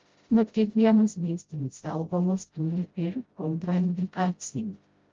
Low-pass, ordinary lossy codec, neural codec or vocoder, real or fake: 7.2 kHz; Opus, 32 kbps; codec, 16 kHz, 0.5 kbps, FreqCodec, smaller model; fake